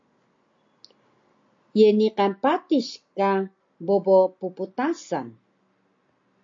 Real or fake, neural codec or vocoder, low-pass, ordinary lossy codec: real; none; 7.2 kHz; AAC, 48 kbps